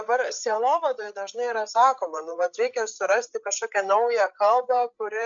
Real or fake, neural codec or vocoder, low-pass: fake; codec, 16 kHz, 8 kbps, FreqCodec, larger model; 7.2 kHz